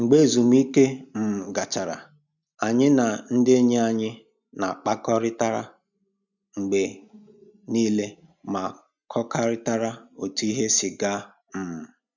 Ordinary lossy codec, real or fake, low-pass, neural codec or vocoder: none; real; 7.2 kHz; none